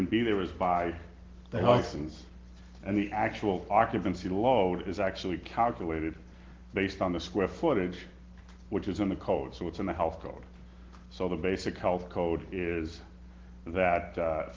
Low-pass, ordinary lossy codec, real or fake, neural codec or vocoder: 7.2 kHz; Opus, 16 kbps; real; none